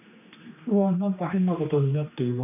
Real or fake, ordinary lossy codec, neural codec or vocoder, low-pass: fake; none; codec, 16 kHz, 2 kbps, X-Codec, HuBERT features, trained on general audio; 3.6 kHz